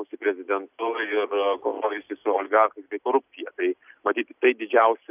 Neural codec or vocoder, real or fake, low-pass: none; real; 3.6 kHz